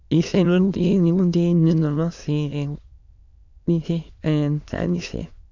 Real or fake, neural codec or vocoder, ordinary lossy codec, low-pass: fake; autoencoder, 22.05 kHz, a latent of 192 numbers a frame, VITS, trained on many speakers; none; 7.2 kHz